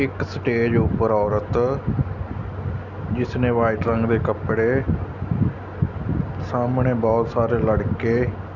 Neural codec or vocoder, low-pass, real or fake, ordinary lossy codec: none; 7.2 kHz; real; none